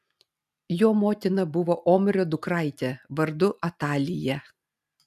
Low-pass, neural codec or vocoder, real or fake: 14.4 kHz; none; real